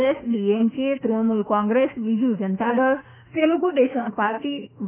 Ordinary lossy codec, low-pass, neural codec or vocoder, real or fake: none; 3.6 kHz; autoencoder, 48 kHz, 32 numbers a frame, DAC-VAE, trained on Japanese speech; fake